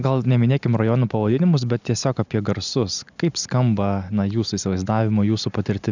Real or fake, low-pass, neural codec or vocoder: real; 7.2 kHz; none